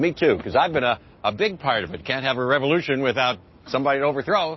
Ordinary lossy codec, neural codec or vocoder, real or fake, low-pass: MP3, 24 kbps; none; real; 7.2 kHz